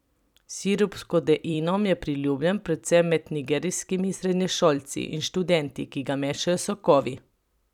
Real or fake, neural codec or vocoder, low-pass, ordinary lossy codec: fake; vocoder, 44.1 kHz, 128 mel bands every 256 samples, BigVGAN v2; 19.8 kHz; none